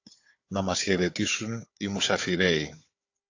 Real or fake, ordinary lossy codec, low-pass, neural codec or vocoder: fake; AAC, 48 kbps; 7.2 kHz; codec, 16 kHz, 4 kbps, FunCodec, trained on Chinese and English, 50 frames a second